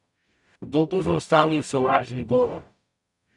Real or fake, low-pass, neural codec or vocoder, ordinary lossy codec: fake; 10.8 kHz; codec, 44.1 kHz, 0.9 kbps, DAC; none